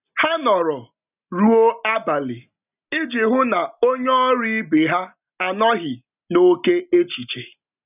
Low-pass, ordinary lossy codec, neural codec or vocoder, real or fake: 3.6 kHz; none; none; real